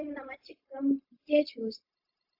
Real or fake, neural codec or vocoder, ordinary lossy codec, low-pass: fake; codec, 16 kHz, 0.4 kbps, LongCat-Audio-Codec; AAC, 48 kbps; 5.4 kHz